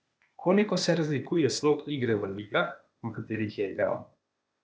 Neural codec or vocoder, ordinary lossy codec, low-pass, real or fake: codec, 16 kHz, 0.8 kbps, ZipCodec; none; none; fake